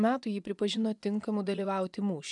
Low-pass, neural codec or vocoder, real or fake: 10.8 kHz; vocoder, 24 kHz, 100 mel bands, Vocos; fake